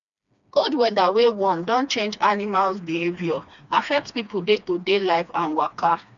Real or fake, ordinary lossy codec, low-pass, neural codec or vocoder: fake; none; 7.2 kHz; codec, 16 kHz, 2 kbps, FreqCodec, smaller model